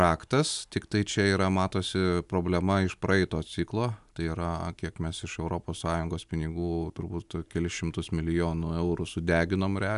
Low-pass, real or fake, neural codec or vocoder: 10.8 kHz; real; none